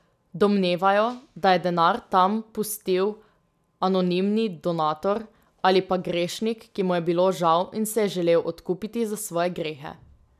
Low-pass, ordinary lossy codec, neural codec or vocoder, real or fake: 14.4 kHz; none; none; real